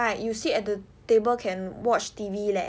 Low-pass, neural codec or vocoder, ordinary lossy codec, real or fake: none; none; none; real